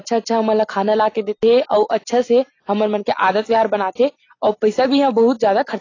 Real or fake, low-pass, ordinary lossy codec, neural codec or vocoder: real; 7.2 kHz; AAC, 32 kbps; none